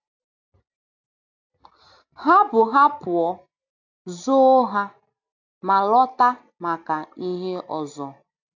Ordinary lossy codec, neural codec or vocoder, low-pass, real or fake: AAC, 48 kbps; none; 7.2 kHz; real